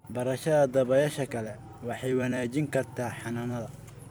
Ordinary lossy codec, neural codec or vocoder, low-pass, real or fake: none; vocoder, 44.1 kHz, 128 mel bands every 256 samples, BigVGAN v2; none; fake